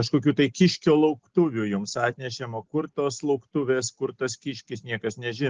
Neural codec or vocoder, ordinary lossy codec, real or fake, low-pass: none; Opus, 16 kbps; real; 7.2 kHz